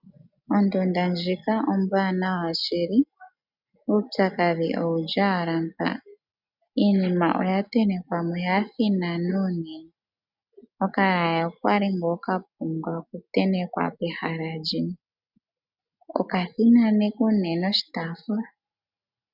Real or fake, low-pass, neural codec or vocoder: real; 5.4 kHz; none